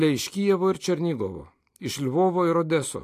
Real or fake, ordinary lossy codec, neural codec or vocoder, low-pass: real; AAC, 64 kbps; none; 14.4 kHz